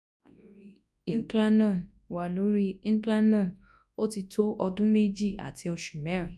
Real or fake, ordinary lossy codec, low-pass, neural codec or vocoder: fake; none; none; codec, 24 kHz, 0.9 kbps, WavTokenizer, large speech release